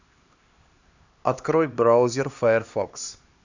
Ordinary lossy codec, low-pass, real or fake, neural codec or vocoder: Opus, 64 kbps; 7.2 kHz; fake; codec, 16 kHz, 2 kbps, X-Codec, HuBERT features, trained on LibriSpeech